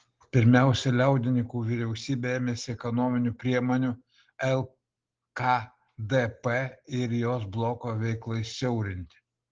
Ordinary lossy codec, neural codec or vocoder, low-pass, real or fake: Opus, 16 kbps; none; 7.2 kHz; real